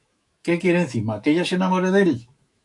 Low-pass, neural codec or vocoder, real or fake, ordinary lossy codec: 10.8 kHz; autoencoder, 48 kHz, 128 numbers a frame, DAC-VAE, trained on Japanese speech; fake; AAC, 64 kbps